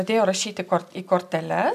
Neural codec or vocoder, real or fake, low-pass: none; real; 14.4 kHz